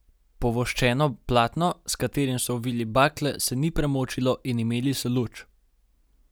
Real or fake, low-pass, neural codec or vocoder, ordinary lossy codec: real; none; none; none